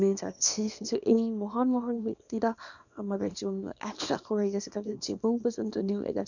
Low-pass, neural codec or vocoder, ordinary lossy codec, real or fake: 7.2 kHz; codec, 24 kHz, 0.9 kbps, WavTokenizer, small release; none; fake